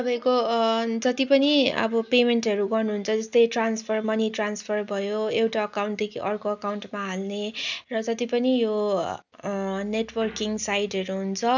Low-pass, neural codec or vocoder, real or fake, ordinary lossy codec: 7.2 kHz; none; real; none